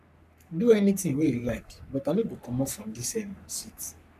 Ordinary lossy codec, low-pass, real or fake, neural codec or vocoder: none; 14.4 kHz; fake; codec, 44.1 kHz, 3.4 kbps, Pupu-Codec